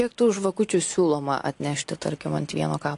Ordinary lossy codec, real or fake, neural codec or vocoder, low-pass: AAC, 48 kbps; real; none; 10.8 kHz